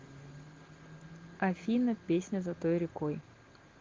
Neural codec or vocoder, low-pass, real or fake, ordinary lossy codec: none; 7.2 kHz; real; Opus, 16 kbps